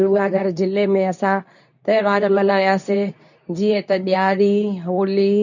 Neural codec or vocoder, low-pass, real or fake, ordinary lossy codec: codec, 24 kHz, 0.9 kbps, WavTokenizer, medium speech release version 2; 7.2 kHz; fake; none